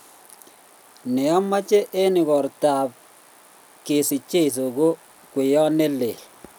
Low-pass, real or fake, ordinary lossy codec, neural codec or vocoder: none; real; none; none